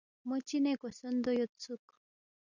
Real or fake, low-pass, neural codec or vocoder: real; 7.2 kHz; none